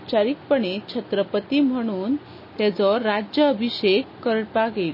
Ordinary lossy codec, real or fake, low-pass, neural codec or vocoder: MP3, 24 kbps; real; 5.4 kHz; none